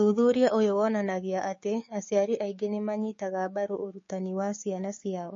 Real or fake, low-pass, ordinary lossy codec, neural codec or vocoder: fake; 7.2 kHz; MP3, 32 kbps; codec, 16 kHz, 6 kbps, DAC